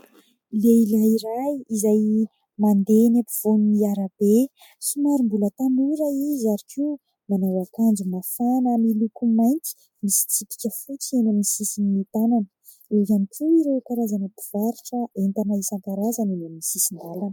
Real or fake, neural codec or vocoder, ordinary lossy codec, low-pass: real; none; MP3, 96 kbps; 19.8 kHz